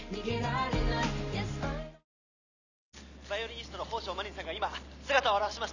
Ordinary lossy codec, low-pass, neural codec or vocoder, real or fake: none; 7.2 kHz; none; real